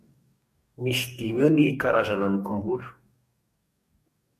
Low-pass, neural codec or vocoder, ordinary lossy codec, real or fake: 14.4 kHz; codec, 44.1 kHz, 2.6 kbps, DAC; MP3, 64 kbps; fake